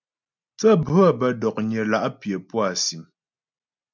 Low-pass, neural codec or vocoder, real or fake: 7.2 kHz; none; real